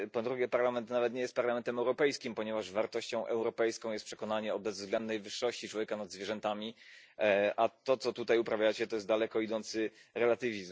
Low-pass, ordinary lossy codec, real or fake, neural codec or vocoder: none; none; real; none